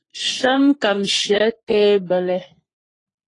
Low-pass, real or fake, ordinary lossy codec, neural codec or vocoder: 10.8 kHz; fake; AAC, 32 kbps; codec, 44.1 kHz, 3.4 kbps, Pupu-Codec